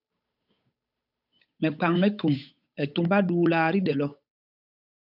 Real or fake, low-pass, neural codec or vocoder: fake; 5.4 kHz; codec, 16 kHz, 8 kbps, FunCodec, trained on Chinese and English, 25 frames a second